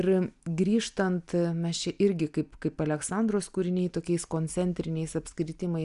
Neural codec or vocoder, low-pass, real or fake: none; 10.8 kHz; real